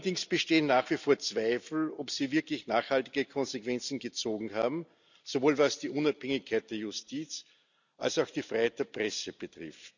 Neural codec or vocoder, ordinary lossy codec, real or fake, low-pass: none; none; real; 7.2 kHz